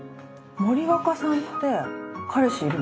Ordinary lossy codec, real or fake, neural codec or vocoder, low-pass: none; real; none; none